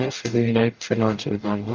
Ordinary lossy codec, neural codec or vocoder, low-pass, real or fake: Opus, 24 kbps; codec, 44.1 kHz, 0.9 kbps, DAC; 7.2 kHz; fake